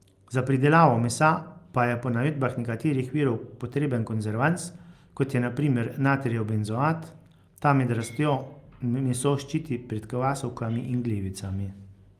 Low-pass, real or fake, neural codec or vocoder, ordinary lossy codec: 14.4 kHz; real; none; Opus, 32 kbps